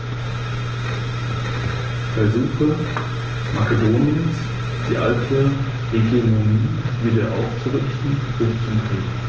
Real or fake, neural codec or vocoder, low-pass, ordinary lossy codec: real; none; 7.2 kHz; Opus, 16 kbps